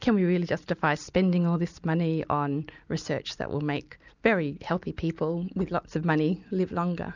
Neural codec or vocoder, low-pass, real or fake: none; 7.2 kHz; real